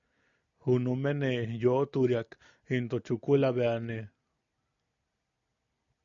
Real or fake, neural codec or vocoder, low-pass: real; none; 7.2 kHz